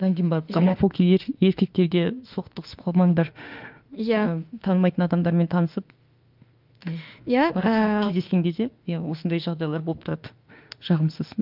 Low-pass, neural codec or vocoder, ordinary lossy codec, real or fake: 5.4 kHz; autoencoder, 48 kHz, 32 numbers a frame, DAC-VAE, trained on Japanese speech; Opus, 24 kbps; fake